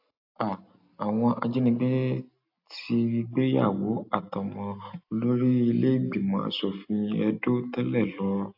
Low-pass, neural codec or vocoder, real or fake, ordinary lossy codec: 5.4 kHz; none; real; AAC, 48 kbps